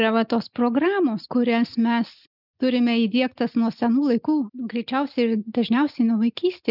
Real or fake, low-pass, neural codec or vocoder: real; 5.4 kHz; none